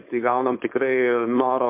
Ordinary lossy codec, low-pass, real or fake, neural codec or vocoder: MP3, 32 kbps; 3.6 kHz; fake; codec, 16 kHz, 4.8 kbps, FACodec